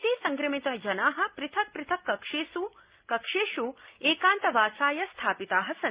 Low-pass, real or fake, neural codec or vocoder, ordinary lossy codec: 3.6 kHz; real; none; Opus, 64 kbps